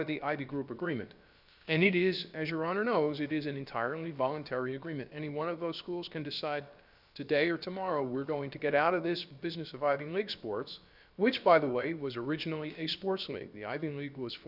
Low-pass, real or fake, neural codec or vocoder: 5.4 kHz; fake; codec, 16 kHz, about 1 kbps, DyCAST, with the encoder's durations